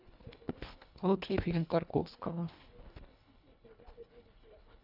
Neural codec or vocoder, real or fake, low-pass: codec, 24 kHz, 1.5 kbps, HILCodec; fake; 5.4 kHz